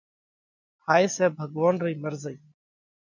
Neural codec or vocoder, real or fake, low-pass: none; real; 7.2 kHz